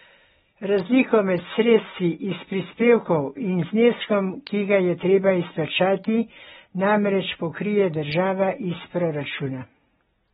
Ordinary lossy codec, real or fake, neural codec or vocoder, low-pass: AAC, 16 kbps; real; none; 19.8 kHz